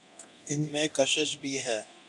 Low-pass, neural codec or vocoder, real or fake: 10.8 kHz; codec, 24 kHz, 0.9 kbps, DualCodec; fake